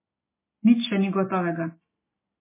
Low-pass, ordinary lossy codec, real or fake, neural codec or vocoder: 3.6 kHz; MP3, 16 kbps; real; none